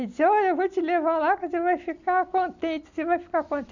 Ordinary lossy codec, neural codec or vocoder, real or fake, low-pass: none; none; real; 7.2 kHz